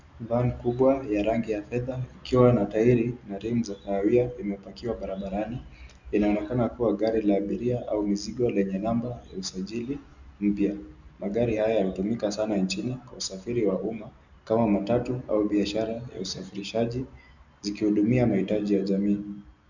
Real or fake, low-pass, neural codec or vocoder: real; 7.2 kHz; none